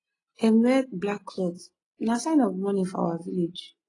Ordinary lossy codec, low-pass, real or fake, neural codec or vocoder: AAC, 32 kbps; 10.8 kHz; real; none